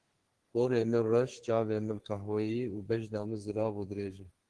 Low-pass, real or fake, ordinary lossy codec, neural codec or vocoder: 10.8 kHz; fake; Opus, 16 kbps; codec, 32 kHz, 1.9 kbps, SNAC